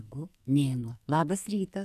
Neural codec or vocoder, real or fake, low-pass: codec, 44.1 kHz, 2.6 kbps, SNAC; fake; 14.4 kHz